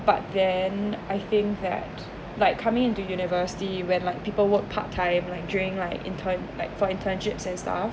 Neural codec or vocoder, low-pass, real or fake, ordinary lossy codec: none; none; real; none